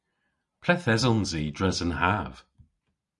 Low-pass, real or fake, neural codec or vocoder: 10.8 kHz; real; none